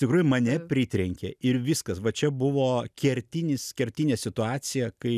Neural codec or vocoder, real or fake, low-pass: none; real; 14.4 kHz